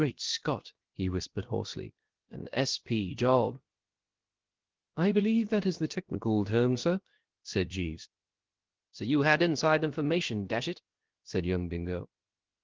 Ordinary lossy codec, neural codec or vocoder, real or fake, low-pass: Opus, 16 kbps; codec, 16 kHz, about 1 kbps, DyCAST, with the encoder's durations; fake; 7.2 kHz